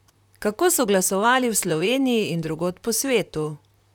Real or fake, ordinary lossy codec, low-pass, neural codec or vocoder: fake; none; 19.8 kHz; vocoder, 44.1 kHz, 128 mel bands, Pupu-Vocoder